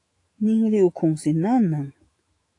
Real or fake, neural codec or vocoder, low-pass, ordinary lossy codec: fake; autoencoder, 48 kHz, 128 numbers a frame, DAC-VAE, trained on Japanese speech; 10.8 kHz; AAC, 48 kbps